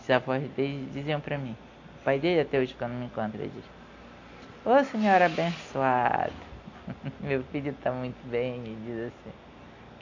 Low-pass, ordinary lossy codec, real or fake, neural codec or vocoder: 7.2 kHz; AAC, 48 kbps; real; none